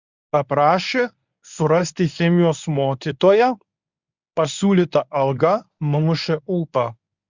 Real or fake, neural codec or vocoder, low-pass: fake; codec, 24 kHz, 0.9 kbps, WavTokenizer, medium speech release version 1; 7.2 kHz